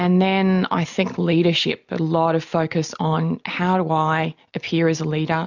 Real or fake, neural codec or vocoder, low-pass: real; none; 7.2 kHz